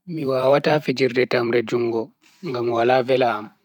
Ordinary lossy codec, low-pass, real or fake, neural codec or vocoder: none; 19.8 kHz; fake; vocoder, 44.1 kHz, 128 mel bands every 512 samples, BigVGAN v2